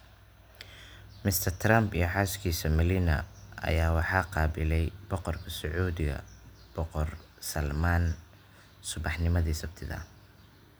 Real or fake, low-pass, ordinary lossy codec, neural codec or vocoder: real; none; none; none